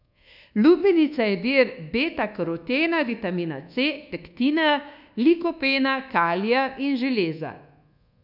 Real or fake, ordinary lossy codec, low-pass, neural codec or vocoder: fake; none; 5.4 kHz; codec, 24 kHz, 1.2 kbps, DualCodec